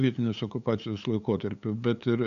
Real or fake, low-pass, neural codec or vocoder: fake; 7.2 kHz; codec, 16 kHz, 8 kbps, FunCodec, trained on LibriTTS, 25 frames a second